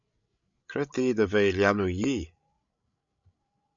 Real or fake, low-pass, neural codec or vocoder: fake; 7.2 kHz; codec, 16 kHz, 16 kbps, FreqCodec, larger model